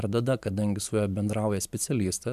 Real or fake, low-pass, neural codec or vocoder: fake; 14.4 kHz; vocoder, 44.1 kHz, 128 mel bands every 512 samples, BigVGAN v2